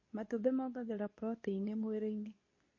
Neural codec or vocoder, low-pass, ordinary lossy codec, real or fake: codec, 24 kHz, 0.9 kbps, WavTokenizer, medium speech release version 2; 7.2 kHz; MP3, 32 kbps; fake